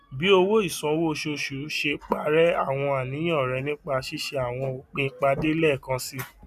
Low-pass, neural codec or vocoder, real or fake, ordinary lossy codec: 14.4 kHz; none; real; none